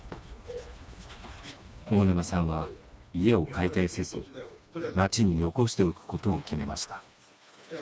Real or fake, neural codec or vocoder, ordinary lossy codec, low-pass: fake; codec, 16 kHz, 2 kbps, FreqCodec, smaller model; none; none